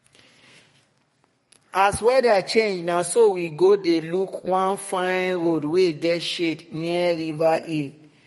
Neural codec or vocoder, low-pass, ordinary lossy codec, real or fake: codec, 32 kHz, 1.9 kbps, SNAC; 14.4 kHz; MP3, 48 kbps; fake